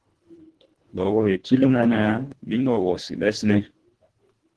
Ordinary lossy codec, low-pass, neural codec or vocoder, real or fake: Opus, 16 kbps; 10.8 kHz; codec, 24 kHz, 1.5 kbps, HILCodec; fake